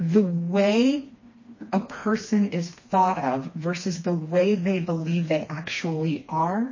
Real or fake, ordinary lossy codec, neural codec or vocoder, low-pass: fake; MP3, 32 kbps; codec, 16 kHz, 2 kbps, FreqCodec, smaller model; 7.2 kHz